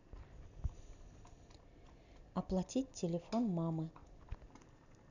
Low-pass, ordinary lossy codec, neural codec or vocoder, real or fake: 7.2 kHz; none; none; real